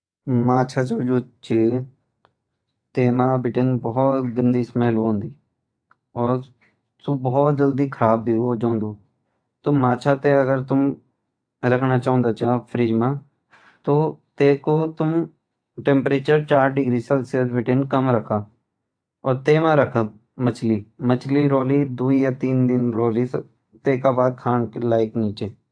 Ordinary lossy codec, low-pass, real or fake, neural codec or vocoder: AAC, 64 kbps; 9.9 kHz; fake; vocoder, 22.05 kHz, 80 mel bands, WaveNeXt